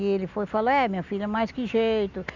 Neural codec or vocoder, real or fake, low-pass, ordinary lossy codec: none; real; 7.2 kHz; none